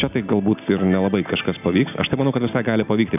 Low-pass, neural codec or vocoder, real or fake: 3.6 kHz; none; real